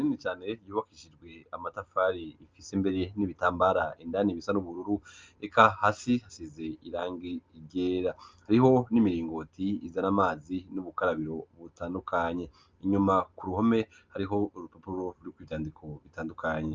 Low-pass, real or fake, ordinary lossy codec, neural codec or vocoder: 7.2 kHz; real; Opus, 24 kbps; none